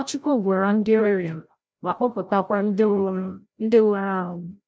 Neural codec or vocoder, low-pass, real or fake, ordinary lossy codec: codec, 16 kHz, 0.5 kbps, FreqCodec, larger model; none; fake; none